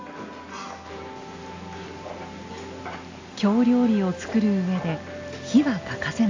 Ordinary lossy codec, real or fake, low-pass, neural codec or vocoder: AAC, 48 kbps; real; 7.2 kHz; none